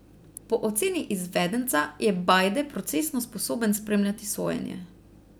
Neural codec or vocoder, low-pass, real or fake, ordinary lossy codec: vocoder, 44.1 kHz, 128 mel bands every 256 samples, BigVGAN v2; none; fake; none